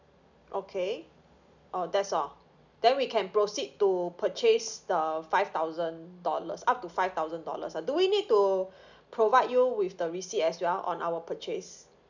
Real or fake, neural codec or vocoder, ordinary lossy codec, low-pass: real; none; none; 7.2 kHz